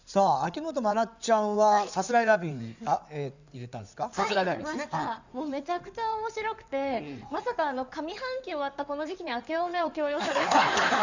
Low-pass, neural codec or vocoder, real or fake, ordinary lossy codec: 7.2 kHz; codec, 16 kHz in and 24 kHz out, 2.2 kbps, FireRedTTS-2 codec; fake; none